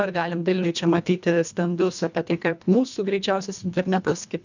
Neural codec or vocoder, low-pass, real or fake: codec, 24 kHz, 1.5 kbps, HILCodec; 7.2 kHz; fake